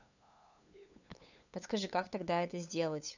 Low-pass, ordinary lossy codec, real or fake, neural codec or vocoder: 7.2 kHz; none; fake; codec, 16 kHz, 8 kbps, FunCodec, trained on LibriTTS, 25 frames a second